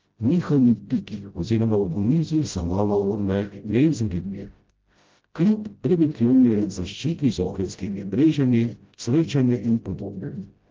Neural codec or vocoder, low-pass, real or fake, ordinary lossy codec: codec, 16 kHz, 0.5 kbps, FreqCodec, smaller model; 7.2 kHz; fake; Opus, 24 kbps